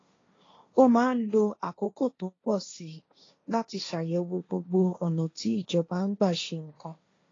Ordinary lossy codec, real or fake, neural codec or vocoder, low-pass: AAC, 32 kbps; fake; codec, 16 kHz, 1.1 kbps, Voila-Tokenizer; 7.2 kHz